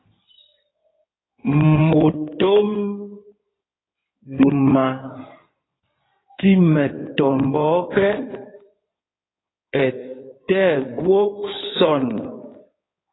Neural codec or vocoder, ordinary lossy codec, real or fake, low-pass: codec, 16 kHz in and 24 kHz out, 2.2 kbps, FireRedTTS-2 codec; AAC, 16 kbps; fake; 7.2 kHz